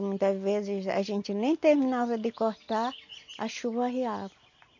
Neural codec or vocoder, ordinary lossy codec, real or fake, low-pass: none; MP3, 48 kbps; real; 7.2 kHz